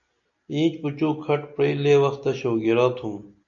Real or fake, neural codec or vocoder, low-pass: real; none; 7.2 kHz